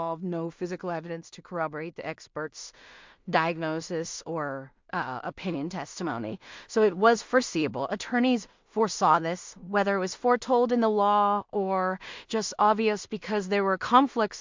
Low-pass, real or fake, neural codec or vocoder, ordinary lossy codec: 7.2 kHz; fake; codec, 16 kHz in and 24 kHz out, 0.4 kbps, LongCat-Audio-Codec, two codebook decoder; MP3, 64 kbps